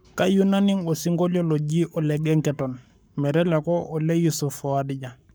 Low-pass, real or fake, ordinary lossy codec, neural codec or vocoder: none; fake; none; codec, 44.1 kHz, 7.8 kbps, Pupu-Codec